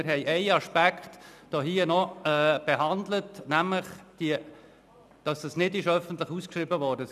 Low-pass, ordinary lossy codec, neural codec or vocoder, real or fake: 14.4 kHz; none; none; real